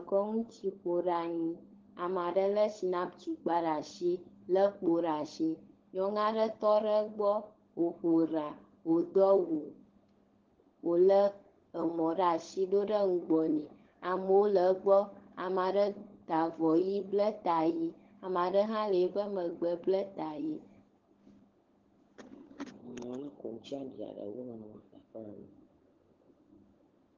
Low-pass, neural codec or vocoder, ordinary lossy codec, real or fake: 7.2 kHz; codec, 16 kHz, 16 kbps, FunCodec, trained on LibriTTS, 50 frames a second; Opus, 16 kbps; fake